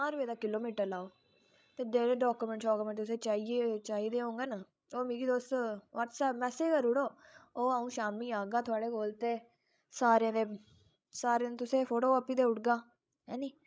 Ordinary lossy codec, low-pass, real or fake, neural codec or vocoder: none; none; fake; codec, 16 kHz, 16 kbps, FreqCodec, larger model